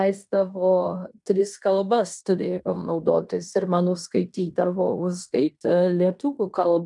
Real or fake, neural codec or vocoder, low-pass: fake; codec, 16 kHz in and 24 kHz out, 0.9 kbps, LongCat-Audio-Codec, fine tuned four codebook decoder; 10.8 kHz